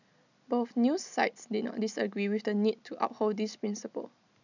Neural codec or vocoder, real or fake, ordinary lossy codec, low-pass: none; real; none; 7.2 kHz